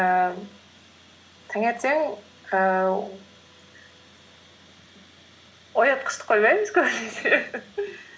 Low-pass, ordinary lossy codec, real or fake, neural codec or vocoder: none; none; real; none